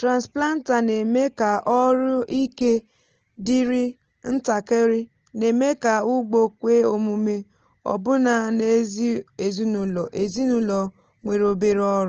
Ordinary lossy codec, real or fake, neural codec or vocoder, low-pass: Opus, 16 kbps; real; none; 7.2 kHz